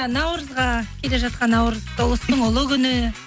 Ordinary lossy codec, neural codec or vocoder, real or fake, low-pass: none; none; real; none